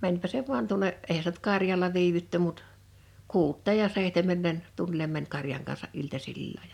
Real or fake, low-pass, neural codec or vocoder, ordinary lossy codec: real; 19.8 kHz; none; none